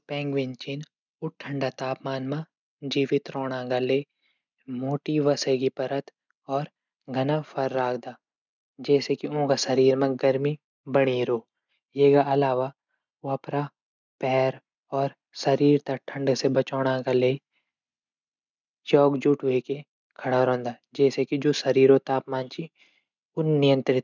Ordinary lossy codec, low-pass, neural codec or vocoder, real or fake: none; 7.2 kHz; none; real